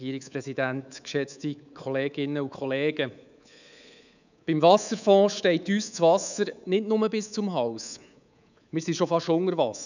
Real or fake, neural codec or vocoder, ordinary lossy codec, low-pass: fake; codec, 24 kHz, 3.1 kbps, DualCodec; none; 7.2 kHz